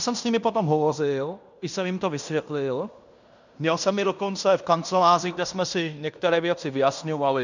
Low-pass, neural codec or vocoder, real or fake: 7.2 kHz; codec, 16 kHz in and 24 kHz out, 0.9 kbps, LongCat-Audio-Codec, fine tuned four codebook decoder; fake